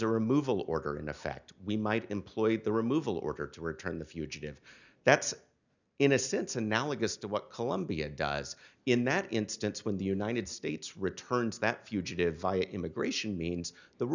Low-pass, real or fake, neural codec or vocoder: 7.2 kHz; real; none